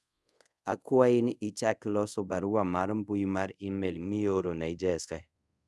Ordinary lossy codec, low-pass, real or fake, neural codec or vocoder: none; none; fake; codec, 24 kHz, 0.5 kbps, DualCodec